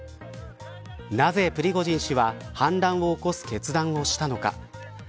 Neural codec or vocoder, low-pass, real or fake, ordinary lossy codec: none; none; real; none